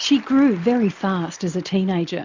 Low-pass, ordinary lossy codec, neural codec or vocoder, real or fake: 7.2 kHz; MP3, 64 kbps; none; real